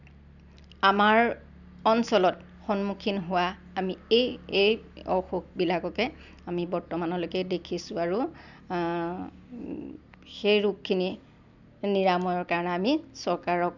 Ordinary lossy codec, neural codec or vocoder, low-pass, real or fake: none; none; 7.2 kHz; real